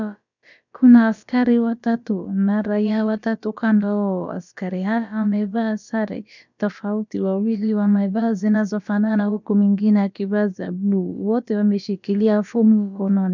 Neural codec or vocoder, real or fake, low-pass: codec, 16 kHz, about 1 kbps, DyCAST, with the encoder's durations; fake; 7.2 kHz